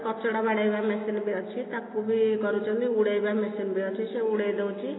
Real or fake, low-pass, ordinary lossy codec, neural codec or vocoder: real; 7.2 kHz; AAC, 16 kbps; none